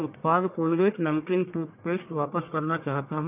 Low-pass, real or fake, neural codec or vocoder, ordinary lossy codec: 3.6 kHz; fake; codec, 44.1 kHz, 1.7 kbps, Pupu-Codec; none